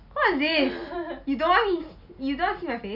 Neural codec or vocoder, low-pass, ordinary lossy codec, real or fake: none; 5.4 kHz; none; real